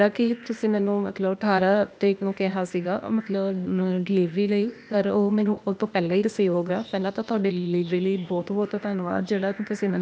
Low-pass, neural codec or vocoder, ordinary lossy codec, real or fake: none; codec, 16 kHz, 0.8 kbps, ZipCodec; none; fake